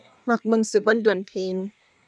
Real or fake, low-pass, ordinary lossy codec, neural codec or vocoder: fake; none; none; codec, 24 kHz, 1 kbps, SNAC